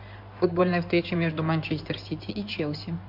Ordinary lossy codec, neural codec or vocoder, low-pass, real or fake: MP3, 48 kbps; codec, 16 kHz in and 24 kHz out, 2.2 kbps, FireRedTTS-2 codec; 5.4 kHz; fake